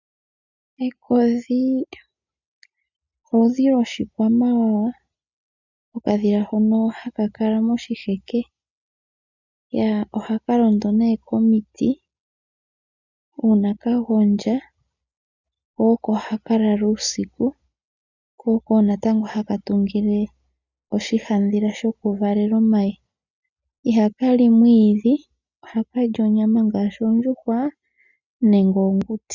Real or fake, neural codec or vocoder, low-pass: real; none; 7.2 kHz